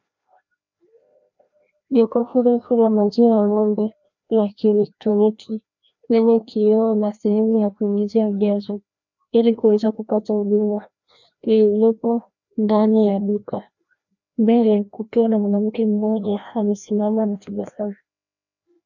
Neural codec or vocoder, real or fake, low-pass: codec, 16 kHz, 1 kbps, FreqCodec, larger model; fake; 7.2 kHz